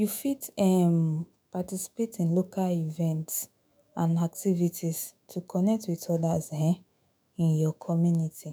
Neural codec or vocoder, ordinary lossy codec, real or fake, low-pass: autoencoder, 48 kHz, 128 numbers a frame, DAC-VAE, trained on Japanese speech; none; fake; none